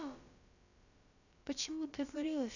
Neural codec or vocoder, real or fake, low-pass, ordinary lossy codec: codec, 16 kHz, about 1 kbps, DyCAST, with the encoder's durations; fake; 7.2 kHz; none